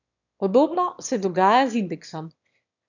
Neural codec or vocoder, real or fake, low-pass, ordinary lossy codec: autoencoder, 22.05 kHz, a latent of 192 numbers a frame, VITS, trained on one speaker; fake; 7.2 kHz; none